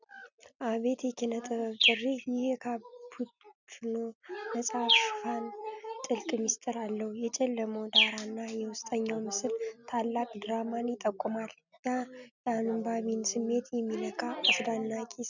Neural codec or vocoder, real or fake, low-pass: none; real; 7.2 kHz